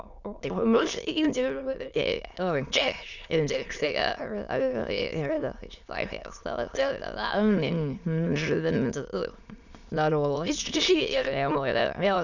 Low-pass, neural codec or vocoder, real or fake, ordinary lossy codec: 7.2 kHz; autoencoder, 22.05 kHz, a latent of 192 numbers a frame, VITS, trained on many speakers; fake; none